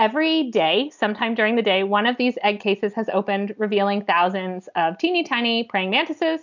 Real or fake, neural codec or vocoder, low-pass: real; none; 7.2 kHz